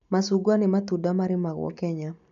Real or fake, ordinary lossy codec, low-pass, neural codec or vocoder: real; none; 7.2 kHz; none